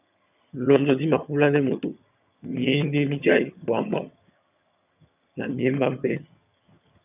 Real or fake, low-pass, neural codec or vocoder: fake; 3.6 kHz; vocoder, 22.05 kHz, 80 mel bands, HiFi-GAN